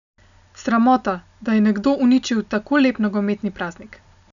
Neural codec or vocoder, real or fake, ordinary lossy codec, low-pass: none; real; none; 7.2 kHz